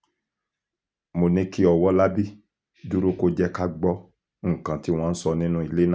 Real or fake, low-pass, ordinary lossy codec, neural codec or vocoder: real; none; none; none